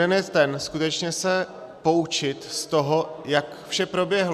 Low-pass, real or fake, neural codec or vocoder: 14.4 kHz; real; none